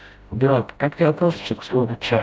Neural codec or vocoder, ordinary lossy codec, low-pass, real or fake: codec, 16 kHz, 0.5 kbps, FreqCodec, smaller model; none; none; fake